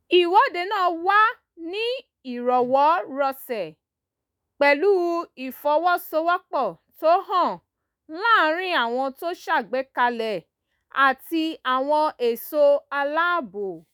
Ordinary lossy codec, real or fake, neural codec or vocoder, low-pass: none; fake; autoencoder, 48 kHz, 128 numbers a frame, DAC-VAE, trained on Japanese speech; none